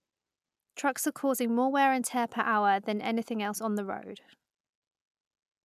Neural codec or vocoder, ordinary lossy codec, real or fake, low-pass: none; none; real; 14.4 kHz